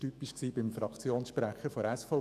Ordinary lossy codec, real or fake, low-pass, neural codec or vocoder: none; fake; 14.4 kHz; autoencoder, 48 kHz, 128 numbers a frame, DAC-VAE, trained on Japanese speech